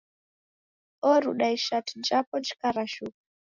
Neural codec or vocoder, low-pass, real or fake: none; 7.2 kHz; real